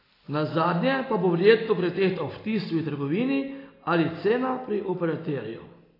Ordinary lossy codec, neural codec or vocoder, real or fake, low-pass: AAC, 24 kbps; none; real; 5.4 kHz